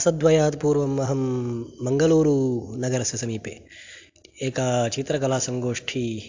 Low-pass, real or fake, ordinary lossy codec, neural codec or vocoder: 7.2 kHz; real; AAC, 48 kbps; none